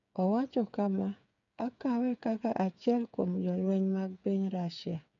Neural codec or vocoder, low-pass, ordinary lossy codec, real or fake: codec, 16 kHz, 8 kbps, FreqCodec, smaller model; 7.2 kHz; none; fake